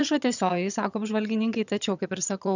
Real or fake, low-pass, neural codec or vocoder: fake; 7.2 kHz; vocoder, 22.05 kHz, 80 mel bands, HiFi-GAN